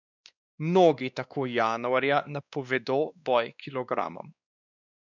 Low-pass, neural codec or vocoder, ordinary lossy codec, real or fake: 7.2 kHz; codec, 16 kHz, 2 kbps, X-Codec, WavLM features, trained on Multilingual LibriSpeech; none; fake